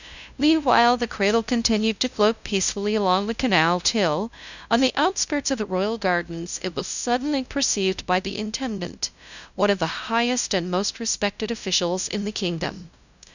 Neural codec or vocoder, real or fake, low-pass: codec, 16 kHz, 0.5 kbps, FunCodec, trained on LibriTTS, 25 frames a second; fake; 7.2 kHz